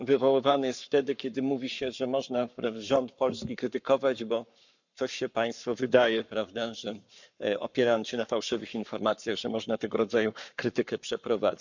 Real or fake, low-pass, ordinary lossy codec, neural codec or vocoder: fake; 7.2 kHz; none; codec, 44.1 kHz, 7.8 kbps, Pupu-Codec